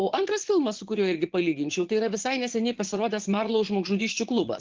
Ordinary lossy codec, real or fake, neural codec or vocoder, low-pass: Opus, 16 kbps; fake; vocoder, 22.05 kHz, 80 mel bands, Vocos; 7.2 kHz